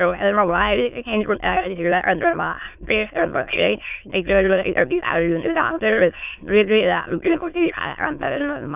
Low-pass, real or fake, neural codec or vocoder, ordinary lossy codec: 3.6 kHz; fake; autoencoder, 22.05 kHz, a latent of 192 numbers a frame, VITS, trained on many speakers; none